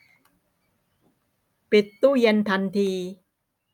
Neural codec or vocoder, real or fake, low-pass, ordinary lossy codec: none; real; 19.8 kHz; none